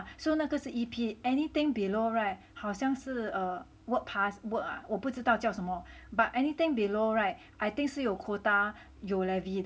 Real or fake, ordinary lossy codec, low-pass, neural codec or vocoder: real; none; none; none